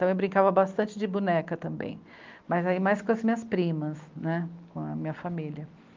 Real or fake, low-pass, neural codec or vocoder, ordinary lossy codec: real; 7.2 kHz; none; Opus, 24 kbps